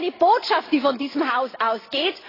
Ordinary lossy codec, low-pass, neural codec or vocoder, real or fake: AAC, 24 kbps; 5.4 kHz; none; real